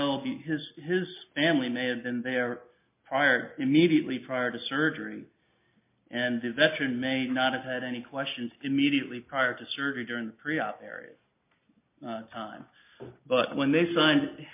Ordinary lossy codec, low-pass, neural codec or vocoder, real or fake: MP3, 32 kbps; 3.6 kHz; none; real